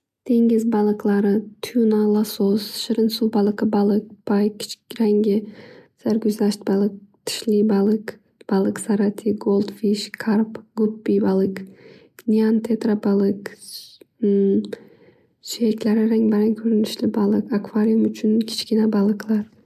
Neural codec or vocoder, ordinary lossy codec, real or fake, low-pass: none; MP3, 96 kbps; real; 14.4 kHz